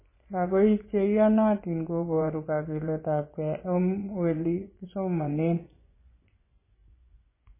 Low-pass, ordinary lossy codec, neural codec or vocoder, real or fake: 3.6 kHz; MP3, 16 kbps; vocoder, 24 kHz, 100 mel bands, Vocos; fake